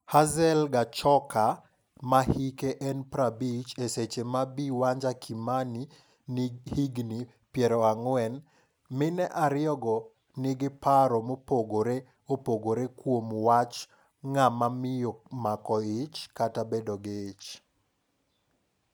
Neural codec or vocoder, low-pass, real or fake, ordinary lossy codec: none; none; real; none